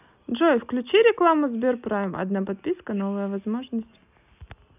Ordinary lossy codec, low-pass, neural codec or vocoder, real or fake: none; 3.6 kHz; none; real